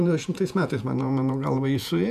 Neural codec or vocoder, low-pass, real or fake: vocoder, 48 kHz, 128 mel bands, Vocos; 14.4 kHz; fake